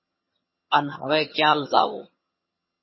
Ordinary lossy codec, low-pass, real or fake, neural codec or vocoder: MP3, 24 kbps; 7.2 kHz; fake; vocoder, 22.05 kHz, 80 mel bands, HiFi-GAN